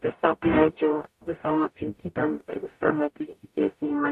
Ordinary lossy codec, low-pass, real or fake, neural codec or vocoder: AAC, 96 kbps; 14.4 kHz; fake; codec, 44.1 kHz, 0.9 kbps, DAC